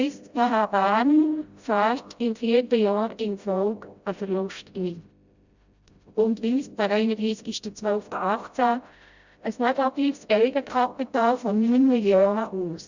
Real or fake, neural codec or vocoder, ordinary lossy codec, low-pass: fake; codec, 16 kHz, 0.5 kbps, FreqCodec, smaller model; Opus, 64 kbps; 7.2 kHz